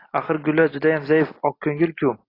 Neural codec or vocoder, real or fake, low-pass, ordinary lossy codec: none; real; 5.4 kHz; AAC, 24 kbps